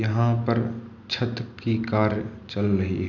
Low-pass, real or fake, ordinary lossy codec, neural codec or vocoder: 7.2 kHz; real; none; none